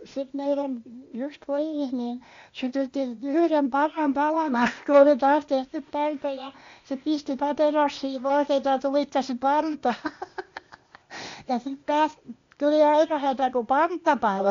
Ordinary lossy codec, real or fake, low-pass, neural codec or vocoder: MP3, 48 kbps; fake; 7.2 kHz; codec, 16 kHz, 0.8 kbps, ZipCodec